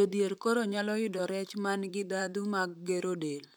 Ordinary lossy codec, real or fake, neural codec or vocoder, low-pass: none; fake; vocoder, 44.1 kHz, 128 mel bands, Pupu-Vocoder; none